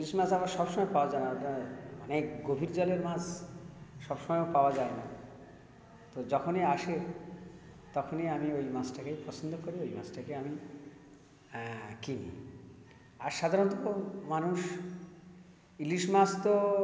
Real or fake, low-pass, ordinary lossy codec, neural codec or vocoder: real; none; none; none